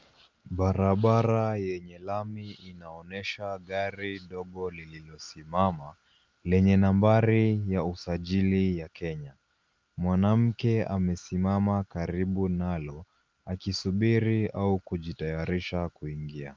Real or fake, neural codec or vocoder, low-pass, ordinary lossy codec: real; none; 7.2 kHz; Opus, 32 kbps